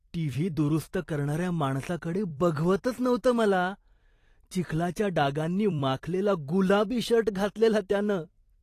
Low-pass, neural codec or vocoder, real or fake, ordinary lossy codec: 14.4 kHz; vocoder, 44.1 kHz, 128 mel bands every 256 samples, BigVGAN v2; fake; AAC, 48 kbps